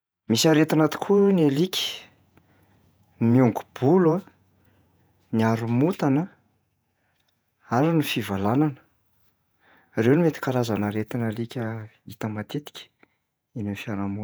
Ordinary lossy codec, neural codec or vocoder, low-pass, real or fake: none; vocoder, 48 kHz, 128 mel bands, Vocos; none; fake